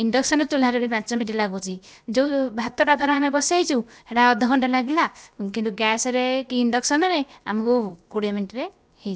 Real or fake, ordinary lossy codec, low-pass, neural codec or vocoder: fake; none; none; codec, 16 kHz, about 1 kbps, DyCAST, with the encoder's durations